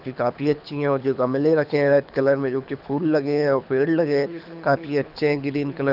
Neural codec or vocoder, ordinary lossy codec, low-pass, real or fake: codec, 24 kHz, 6 kbps, HILCodec; none; 5.4 kHz; fake